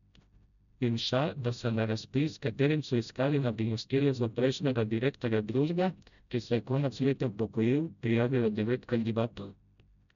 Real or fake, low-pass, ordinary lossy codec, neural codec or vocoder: fake; 7.2 kHz; none; codec, 16 kHz, 0.5 kbps, FreqCodec, smaller model